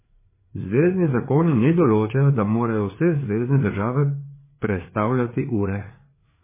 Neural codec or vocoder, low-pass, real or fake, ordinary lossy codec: codec, 16 kHz, 4 kbps, FreqCodec, larger model; 3.6 kHz; fake; MP3, 16 kbps